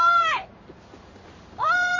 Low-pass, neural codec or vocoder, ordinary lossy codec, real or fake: 7.2 kHz; codec, 16 kHz in and 24 kHz out, 1 kbps, XY-Tokenizer; MP3, 32 kbps; fake